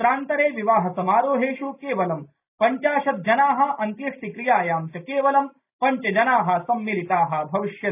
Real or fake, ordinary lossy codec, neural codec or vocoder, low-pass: real; none; none; 3.6 kHz